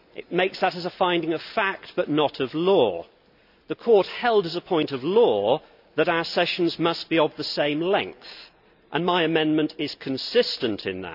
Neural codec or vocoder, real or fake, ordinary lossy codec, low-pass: none; real; none; 5.4 kHz